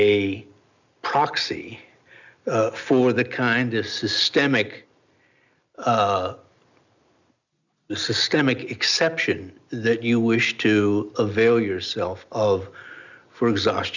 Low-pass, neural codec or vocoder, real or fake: 7.2 kHz; none; real